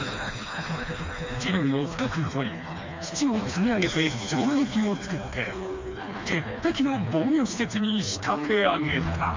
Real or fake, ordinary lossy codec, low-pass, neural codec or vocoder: fake; MP3, 48 kbps; 7.2 kHz; codec, 16 kHz, 2 kbps, FreqCodec, smaller model